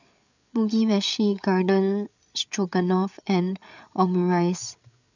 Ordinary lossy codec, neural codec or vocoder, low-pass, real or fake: none; codec, 16 kHz, 8 kbps, FreqCodec, larger model; 7.2 kHz; fake